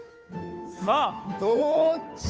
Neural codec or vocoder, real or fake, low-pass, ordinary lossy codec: codec, 16 kHz, 2 kbps, FunCodec, trained on Chinese and English, 25 frames a second; fake; none; none